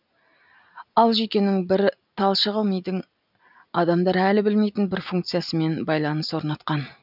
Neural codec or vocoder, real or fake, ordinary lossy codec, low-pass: none; real; none; 5.4 kHz